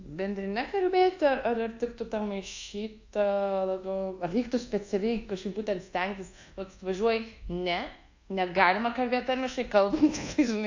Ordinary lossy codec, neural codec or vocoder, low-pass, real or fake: AAC, 48 kbps; codec, 24 kHz, 1.2 kbps, DualCodec; 7.2 kHz; fake